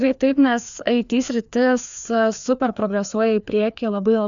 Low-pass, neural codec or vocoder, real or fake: 7.2 kHz; codec, 16 kHz, 2 kbps, FreqCodec, larger model; fake